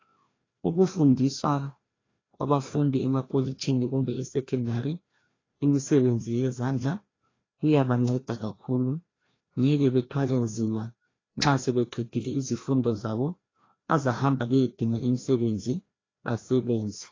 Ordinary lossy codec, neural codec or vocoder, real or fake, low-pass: AAC, 32 kbps; codec, 16 kHz, 1 kbps, FreqCodec, larger model; fake; 7.2 kHz